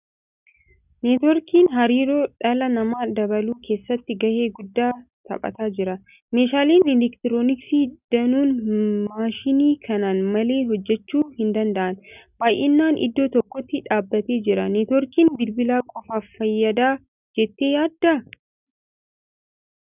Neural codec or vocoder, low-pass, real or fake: none; 3.6 kHz; real